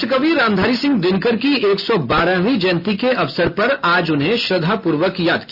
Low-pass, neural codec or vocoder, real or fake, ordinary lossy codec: 5.4 kHz; none; real; none